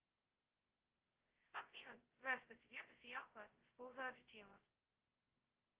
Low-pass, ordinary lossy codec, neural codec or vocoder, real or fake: 3.6 kHz; Opus, 16 kbps; codec, 16 kHz, 0.2 kbps, FocalCodec; fake